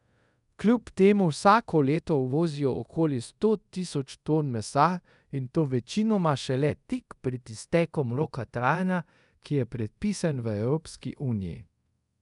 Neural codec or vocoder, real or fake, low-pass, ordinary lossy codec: codec, 24 kHz, 0.5 kbps, DualCodec; fake; 10.8 kHz; none